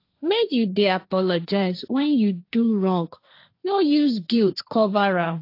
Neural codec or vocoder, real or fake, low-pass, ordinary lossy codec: codec, 16 kHz, 1.1 kbps, Voila-Tokenizer; fake; 5.4 kHz; AAC, 32 kbps